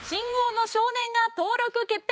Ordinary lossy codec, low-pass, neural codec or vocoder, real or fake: none; none; codec, 16 kHz, 4 kbps, X-Codec, HuBERT features, trained on balanced general audio; fake